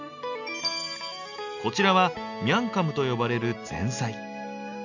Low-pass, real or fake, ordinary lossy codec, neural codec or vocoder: 7.2 kHz; real; none; none